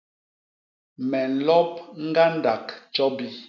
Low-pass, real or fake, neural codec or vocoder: 7.2 kHz; real; none